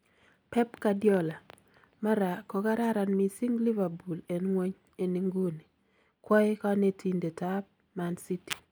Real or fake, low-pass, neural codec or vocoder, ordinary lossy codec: real; none; none; none